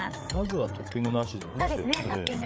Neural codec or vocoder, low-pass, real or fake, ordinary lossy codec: codec, 16 kHz, 8 kbps, FreqCodec, larger model; none; fake; none